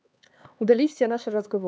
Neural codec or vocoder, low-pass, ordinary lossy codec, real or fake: codec, 16 kHz, 4 kbps, X-Codec, HuBERT features, trained on LibriSpeech; none; none; fake